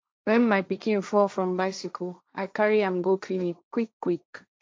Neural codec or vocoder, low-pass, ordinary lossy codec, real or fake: codec, 16 kHz, 1.1 kbps, Voila-Tokenizer; none; none; fake